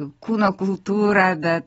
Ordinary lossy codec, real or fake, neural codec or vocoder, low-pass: AAC, 24 kbps; real; none; 19.8 kHz